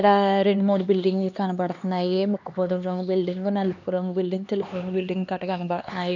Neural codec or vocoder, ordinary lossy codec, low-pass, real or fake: codec, 16 kHz, 2 kbps, X-Codec, HuBERT features, trained on LibriSpeech; none; 7.2 kHz; fake